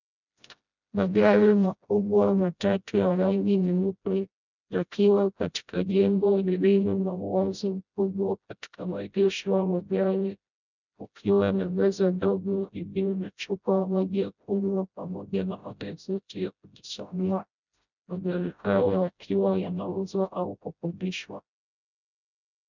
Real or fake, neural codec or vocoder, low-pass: fake; codec, 16 kHz, 0.5 kbps, FreqCodec, smaller model; 7.2 kHz